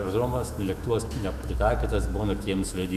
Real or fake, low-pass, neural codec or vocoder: fake; 14.4 kHz; autoencoder, 48 kHz, 128 numbers a frame, DAC-VAE, trained on Japanese speech